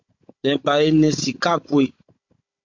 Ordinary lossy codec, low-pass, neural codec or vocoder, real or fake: MP3, 48 kbps; 7.2 kHz; codec, 16 kHz, 16 kbps, FunCodec, trained on Chinese and English, 50 frames a second; fake